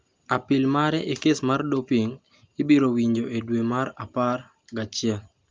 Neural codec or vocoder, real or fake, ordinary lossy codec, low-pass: none; real; Opus, 32 kbps; 7.2 kHz